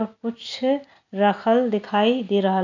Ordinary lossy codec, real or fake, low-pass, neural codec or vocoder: none; real; 7.2 kHz; none